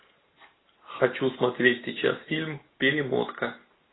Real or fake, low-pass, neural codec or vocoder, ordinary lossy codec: real; 7.2 kHz; none; AAC, 16 kbps